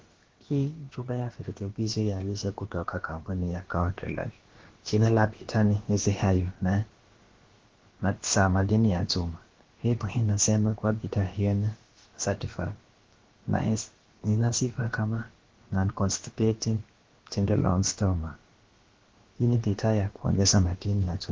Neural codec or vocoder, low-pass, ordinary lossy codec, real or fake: codec, 16 kHz, about 1 kbps, DyCAST, with the encoder's durations; 7.2 kHz; Opus, 16 kbps; fake